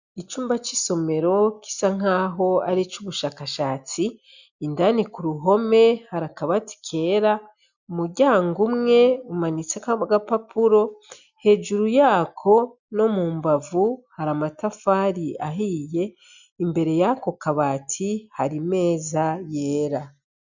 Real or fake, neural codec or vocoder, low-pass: real; none; 7.2 kHz